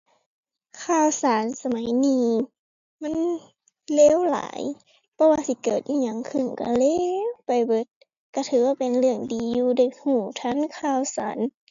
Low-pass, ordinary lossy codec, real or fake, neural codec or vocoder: 7.2 kHz; AAC, 64 kbps; real; none